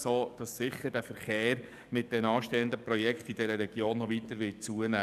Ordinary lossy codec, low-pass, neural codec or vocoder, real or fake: none; 14.4 kHz; codec, 44.1 kHz, 7.8 kbps, DAC; fake